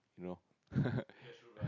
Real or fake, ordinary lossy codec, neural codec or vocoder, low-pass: real; none; none; 7.2 kHz